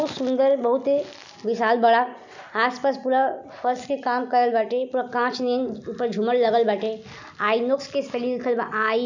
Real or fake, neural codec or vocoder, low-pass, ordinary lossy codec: real; none; 7.2 kHz; none